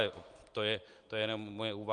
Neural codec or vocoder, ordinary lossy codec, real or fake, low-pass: none; Opus, 32 kbps; real; 9.9 kHz